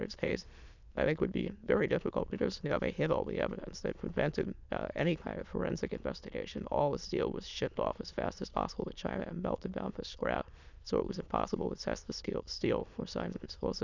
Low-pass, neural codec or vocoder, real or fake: 7.2 kHz; autoencoder, 22.05 kHz, a latent of 192 numbers a frame, VITS, trained on many speakers; fake